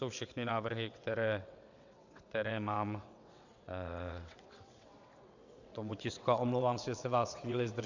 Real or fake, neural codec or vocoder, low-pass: fake; vocoder, 22.05 kHz, 80 mel bands, WaveNeXt; 7.2 kHz